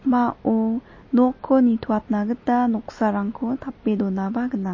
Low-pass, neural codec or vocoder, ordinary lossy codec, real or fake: 7.2 kHz; none; MP3, 32 kbps; real